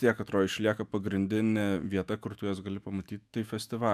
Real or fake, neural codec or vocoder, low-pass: fake; autoencoder, 48 kHz, 128 numbers a frame, DAC-VAE, trained on Japanese speech; 14.4 kHz